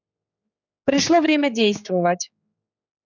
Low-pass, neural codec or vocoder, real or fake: 7.2 kHz; codec, 16 kHz, 4 kbps, X-Codec, HuBERT features, trained on general audio; fake